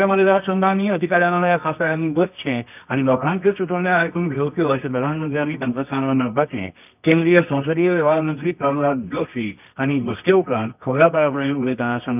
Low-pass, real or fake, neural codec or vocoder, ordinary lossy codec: 3.6 kHz; fake; codec, 24 kHz, 0.9 kbps, WavTokenizer, medium music audio release; none